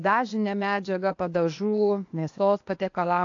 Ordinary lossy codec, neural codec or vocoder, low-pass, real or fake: AAC, 48 kbps; codec, 16 kHz, 0.8 kbps, ZipCodec; 7.2 kHz; fake